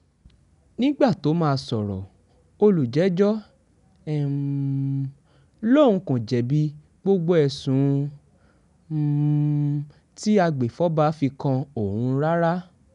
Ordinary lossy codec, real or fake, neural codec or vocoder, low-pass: none; real; none; 10.8 kHz